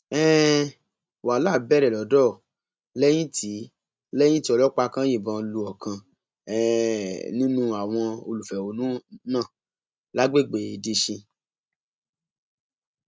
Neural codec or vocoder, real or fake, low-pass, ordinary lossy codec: none; real; none; none